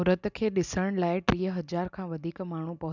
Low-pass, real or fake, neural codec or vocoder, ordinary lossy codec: 7.2 kHz; real; none; none